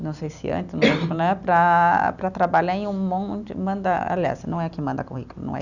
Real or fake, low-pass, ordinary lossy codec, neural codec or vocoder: real; 7.2 kHz; none; none